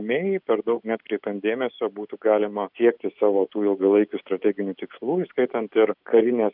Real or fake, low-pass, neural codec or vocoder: real; 5.4 kHz; none